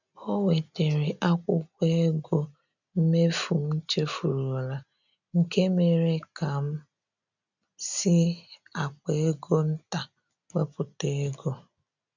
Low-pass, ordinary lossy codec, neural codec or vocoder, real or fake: 7.2 kHz; none; none; real